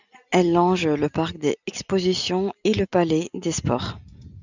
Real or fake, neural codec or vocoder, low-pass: real; none; 7.2 kHz